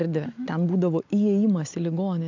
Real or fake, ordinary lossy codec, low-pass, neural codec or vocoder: real; AAC, 48 kbps; 7.2 kHz; none